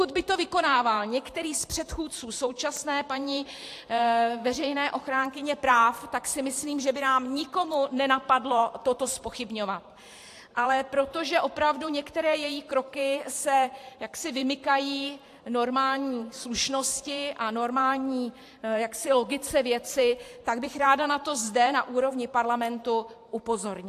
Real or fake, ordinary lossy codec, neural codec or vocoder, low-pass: fake; AAC, 64 kbps; vocoder, 44.1 kHz, 128 mel bands every 512 samples, BigVGAN v2; 14.4 kHz